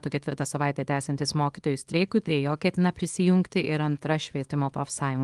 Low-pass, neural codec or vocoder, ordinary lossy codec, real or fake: 10.8 kHz; codec, 24 kHz, 0.9 kbps, WavTokenizer, small release; Opus, 24 kbps; fake